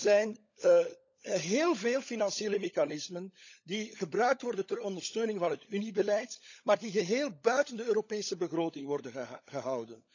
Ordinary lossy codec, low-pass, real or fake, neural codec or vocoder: none; 7.2 kHz; fake; codec, 16 kHz, 16 kbps, FunCodec, trained on LibriTTS, 50 frames a second